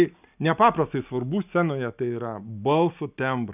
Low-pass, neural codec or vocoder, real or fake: 3.6 kHz; none; real